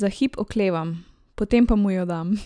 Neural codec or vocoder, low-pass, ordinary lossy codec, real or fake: none; 9.9 kHz; none; real